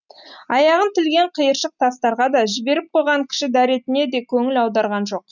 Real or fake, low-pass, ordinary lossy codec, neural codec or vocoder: real; 7.2 kHz; none; none